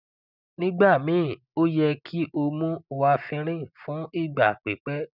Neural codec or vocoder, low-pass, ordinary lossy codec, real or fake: none; 5.4 kHz; none; real